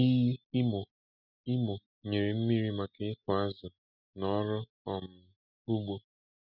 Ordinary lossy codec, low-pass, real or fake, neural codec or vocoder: none; 5.4 kHz; real; none